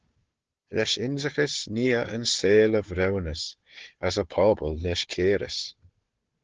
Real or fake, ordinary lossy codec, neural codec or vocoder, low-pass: fake; Opus, 16 kbps; codec, 16 kHz, 4 kbps, FunCodec, trained on Chinese and English, 50 frames a second; 7.2 kHz